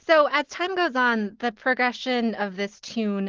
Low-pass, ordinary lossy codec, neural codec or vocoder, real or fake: 7.2 kHz; Opus, 16 kbps; none; real